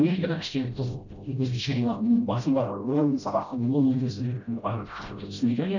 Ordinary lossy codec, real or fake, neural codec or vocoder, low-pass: none; fake; codec, 16 kHz, 0.5 kbps, FreqCodec, smaller model; 7.2 kHz